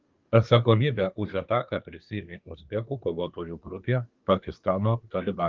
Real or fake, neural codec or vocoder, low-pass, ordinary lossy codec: fake; codec, 24 kHz, 1 kbps, SNAC; 7.2 kHz; Opus, 24 kbps